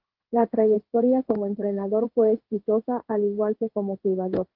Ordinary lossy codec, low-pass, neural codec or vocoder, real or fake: Opus, 16 kbps; 5.4 kHz; codec, 16 kHz in and 24 kHz out, 1 kbps, XY-Tokenizer; fake